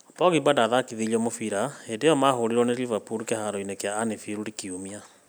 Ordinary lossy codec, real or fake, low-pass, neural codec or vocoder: none; real; none; none